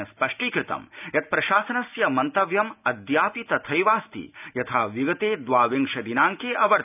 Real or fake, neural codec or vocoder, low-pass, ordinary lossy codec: real; none; 3.6 kHz; none